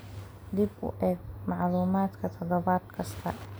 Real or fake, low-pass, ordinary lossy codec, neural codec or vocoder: real; none; none; none